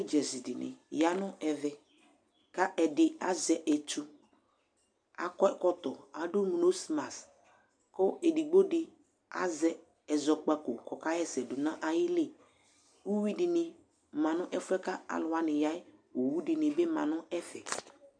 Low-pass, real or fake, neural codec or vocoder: 9.9 kHz; real; none